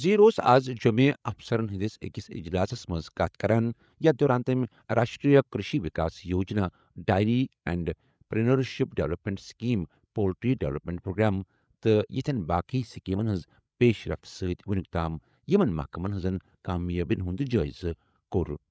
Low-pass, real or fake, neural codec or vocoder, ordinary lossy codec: none; fake; codec, 16 kHz, 8 kbps, FreqCodec, larger model; none